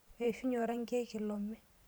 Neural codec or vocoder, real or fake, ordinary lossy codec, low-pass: vocoder, 44.1 kHz, 128 mel bands every 512 samples, BigVGAN v2; fake; none; none